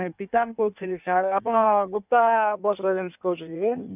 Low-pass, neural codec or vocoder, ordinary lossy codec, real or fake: 3.6 kHz; codec, 16 kHz in and 24 kHz out, 1.1 kbps, FireRedTTS-2 codec; none; fake